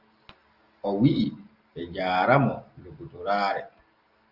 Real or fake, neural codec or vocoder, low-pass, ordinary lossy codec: real; none; 5.4 kHz; Opus, 24 kbps